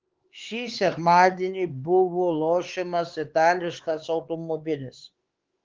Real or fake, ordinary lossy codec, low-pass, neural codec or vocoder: fake; Opus, 16 kbps; 7.2 kHz; codec, 16 kHz, 4 kbps, X-Codec, HuBERT features, trained on LibriSpeech